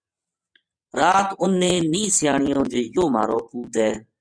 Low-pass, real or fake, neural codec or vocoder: 9.9 kHz; fake; vocoder, 22.05 kHz, 80 mel bands, WaveNeXt